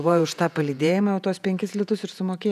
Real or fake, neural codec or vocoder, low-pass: fake; vocoder, 48 kHz, 128 mel bands, Vocos; 14.4 kHz